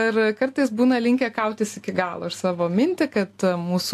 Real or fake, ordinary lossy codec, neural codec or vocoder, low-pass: real; AAC, 64 kbps; none; 14.4 kHz